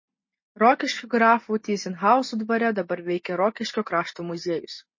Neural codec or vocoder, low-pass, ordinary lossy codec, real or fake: none; 7.2 kHz; MP3, 32 kbps; real